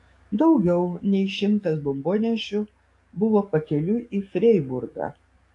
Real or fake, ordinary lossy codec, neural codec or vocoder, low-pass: fake; AAC, 48 kbps; codec, 44.1 kHz, 7.8 kbps, DAC; 10.8 kHz